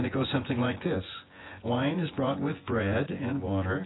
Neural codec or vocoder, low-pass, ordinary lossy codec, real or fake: vocoder, 24 kHz, 100 mel bands, Vocos; 7.2 kHz; AAC, 16 kbps; fake